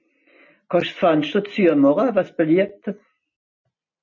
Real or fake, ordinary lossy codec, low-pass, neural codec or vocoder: real; MP3, 32 kbps; 7.2 kHz; none